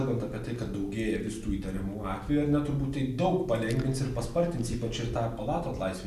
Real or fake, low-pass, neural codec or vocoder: real; 14.4 kHz; none